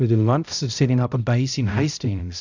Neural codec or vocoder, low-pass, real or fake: codec, 16 kHz, 0.5 kbps, X-Codec, HuBERT features, trained on balanced general audio; 7.2 kHz; fake